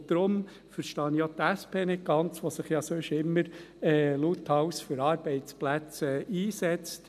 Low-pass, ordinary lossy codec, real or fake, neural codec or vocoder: 14.4 kHz; none; real; none